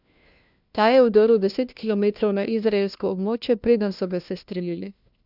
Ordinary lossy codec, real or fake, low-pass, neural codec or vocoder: none; fake; 5.4 kHz; codec, 16 kHz, 1 kbps, FunCodec, trained on LibriTTS, 50 frames a second